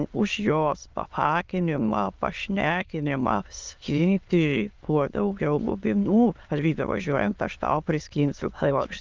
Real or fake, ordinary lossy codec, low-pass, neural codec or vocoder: fake; Opus, 32 kbps; 7.2 kHz; autoencoder, 22.05 kHz, a latent of 192 numbers a frame, VITS, trained on many speakers